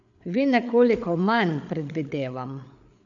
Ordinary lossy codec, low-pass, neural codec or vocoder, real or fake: none; 7.2 kHz; codec, 16 kHz, 8 kbps, FreqCodec, larger model; fake